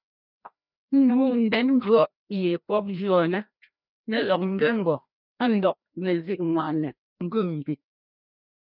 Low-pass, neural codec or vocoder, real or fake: 5.4 kHz; codec, 16 kHz, 1 kbps, FreqCodec, larger model; fake